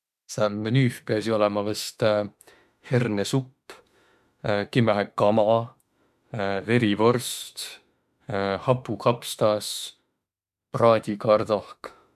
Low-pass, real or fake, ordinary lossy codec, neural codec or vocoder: 14.4 kHz; fake; MP3, 96 kbps; autoencoder, 48 kHz, 32 numbers a frame, DAC-VAE, trained on Japanese speech